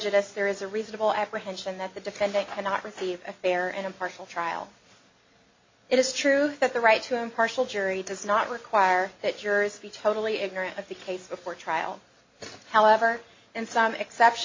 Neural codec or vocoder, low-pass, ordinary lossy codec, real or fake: none; 7.2 kHz; MP3, 32 kbps; real